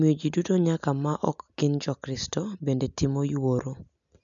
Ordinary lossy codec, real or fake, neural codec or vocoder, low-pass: none; real; none; 7.2 kHz